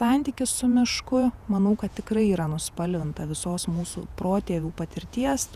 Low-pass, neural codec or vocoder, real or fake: 14.4 kHz; vocoder, 48 kHz, 128 mel bands, Vocos; fake